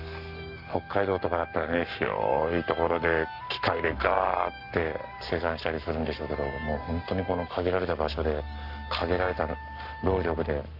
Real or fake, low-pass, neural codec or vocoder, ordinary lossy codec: fake; 5.4 kHz; codec, 44.1 kHz, 7.8 kbps, Pupu-Codec; none